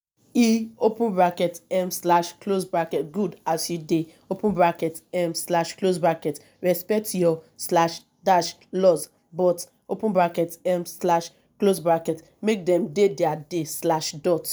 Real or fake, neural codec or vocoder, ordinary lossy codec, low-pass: real; none; none; none